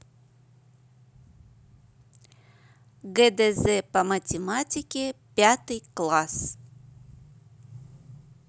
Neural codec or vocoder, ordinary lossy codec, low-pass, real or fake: none; none; none; real